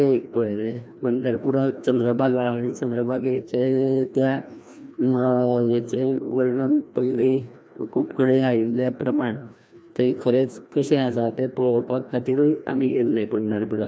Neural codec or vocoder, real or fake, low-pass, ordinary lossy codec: codec, 16 kHz, 1 kbps, FreqCodec, larger model; fake; none; none